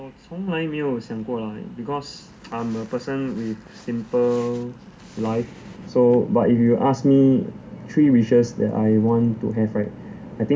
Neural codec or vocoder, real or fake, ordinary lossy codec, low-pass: none; real; none; none